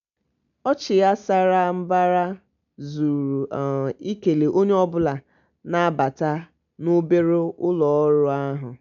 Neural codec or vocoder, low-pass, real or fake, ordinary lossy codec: none; 7.2 kHz; real; none